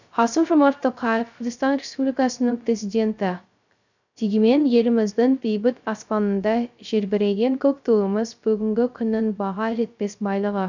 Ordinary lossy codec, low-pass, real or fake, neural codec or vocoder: none; 7.2 kHz; fake; codec, 16 kHz, 0.3 kbps, FocalCodec